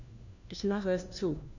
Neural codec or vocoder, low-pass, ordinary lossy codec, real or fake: codec, 16 kHz, 1 kbps, FunCodec, trained on LibriTTS, 50 frames a second; 7.2 kHz; none; fake